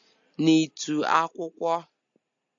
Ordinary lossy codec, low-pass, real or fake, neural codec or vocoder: AAC, 64 kbps; 7.2 kHz; real; none